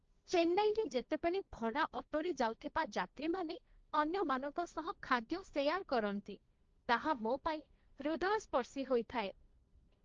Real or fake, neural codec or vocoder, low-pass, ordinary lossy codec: fake; codec, 16 kHz, 1.1 kbps, Voila-Tokenizer; 7.2 kHz; Opus, 24 kbps